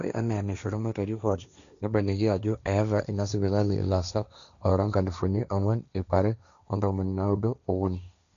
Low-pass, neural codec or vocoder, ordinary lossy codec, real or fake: 7.2 kHz; codec, 16 kHz, 1.1 kbps, Voila-Tokenizer; none; fake